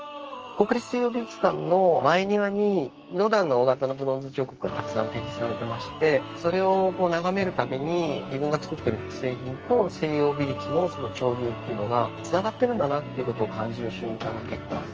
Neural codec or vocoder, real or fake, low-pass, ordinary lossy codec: codec, 44.1 kHz, 2.6 kbps, SNAC; fake; 7.2 kHz; Opus, 24 kbps